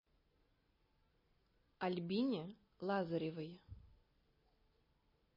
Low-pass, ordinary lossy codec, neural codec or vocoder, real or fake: 5.4 kHz; MP3, 24 kbps; none; real